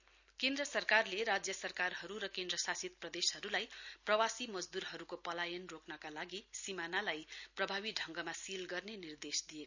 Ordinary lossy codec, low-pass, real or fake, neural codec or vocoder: none; 7.2 kHz; real; none